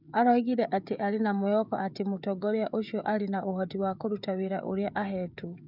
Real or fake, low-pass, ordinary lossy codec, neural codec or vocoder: fake; 5.4 kHz; none; codec, 16 kHz, 16 kbps, FreqCodec, smaller model